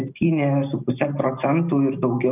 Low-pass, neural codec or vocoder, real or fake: 3.6 kHz; none; real